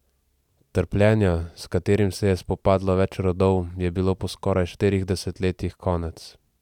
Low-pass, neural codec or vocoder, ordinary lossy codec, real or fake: 19.8 kHz; none; none; real